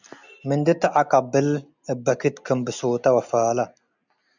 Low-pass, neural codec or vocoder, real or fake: 7.2 kHz; none; real